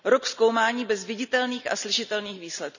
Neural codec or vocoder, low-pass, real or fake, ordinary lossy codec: none; 7.2 kHz; real; none